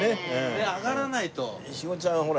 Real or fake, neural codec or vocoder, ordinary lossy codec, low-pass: real; none; none; none